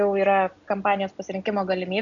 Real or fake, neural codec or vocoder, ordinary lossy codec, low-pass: real; none; MP3, 96 kbps; 7.2 kHz